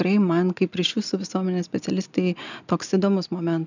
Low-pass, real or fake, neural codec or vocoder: 7.2 kHz; real; none